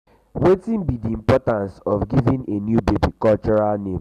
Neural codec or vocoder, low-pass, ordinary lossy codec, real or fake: none; 14.4 kHz; none; real